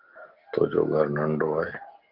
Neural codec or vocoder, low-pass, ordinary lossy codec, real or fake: none; 5.4 kHz; Opus, 16 kbps; real